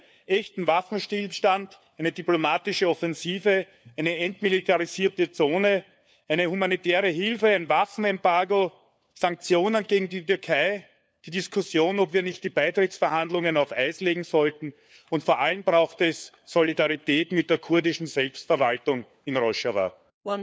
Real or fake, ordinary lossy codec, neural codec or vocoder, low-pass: fake; none; codec, 16 kHz, 4 kbps, FunCodec, trained on LibriTTS, 50 frames a second; none